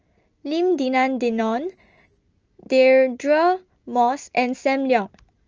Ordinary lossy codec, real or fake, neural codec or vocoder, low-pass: Opus, 24 kbps; real; none; 7.2 kHz